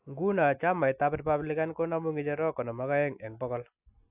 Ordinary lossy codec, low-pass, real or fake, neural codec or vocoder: AAC, 32 kbps; 3.6 kHz; real; none